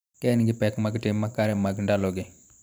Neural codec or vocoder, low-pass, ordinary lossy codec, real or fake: vocoder, 44.1 kHz, 128 mel bands every 512 samples, BigVGAN v2; none; none; fake